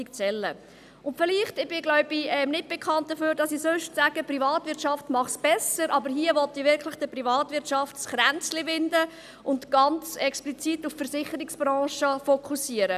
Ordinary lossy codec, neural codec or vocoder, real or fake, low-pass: none; none; real; 14.4 kHz